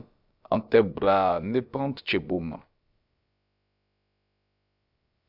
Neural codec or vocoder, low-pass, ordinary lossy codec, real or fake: codec, 16 kHz, about 1 kbps, DyCAST, with the encoder's durations; 5.4 kHz; Opus, 64 kbps; fake